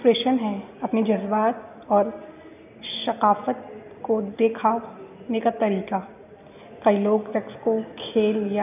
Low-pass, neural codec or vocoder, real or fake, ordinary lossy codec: 3.6 kHz; none; real; none